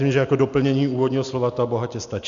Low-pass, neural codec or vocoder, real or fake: 7.2 kHz; none; real